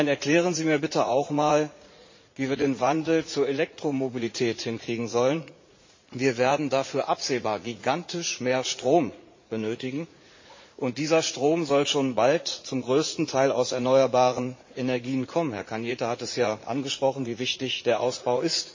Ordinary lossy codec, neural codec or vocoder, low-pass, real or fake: MP3, 32 kbps; vocoder, 44.1 kHz, 80 mel bands, Vocos; 7.2 kHz; fake